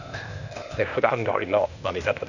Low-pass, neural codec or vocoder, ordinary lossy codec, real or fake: 7.2 kHz; codec, 16 kHz, 0.8 kbps, ZipCodec; none; fake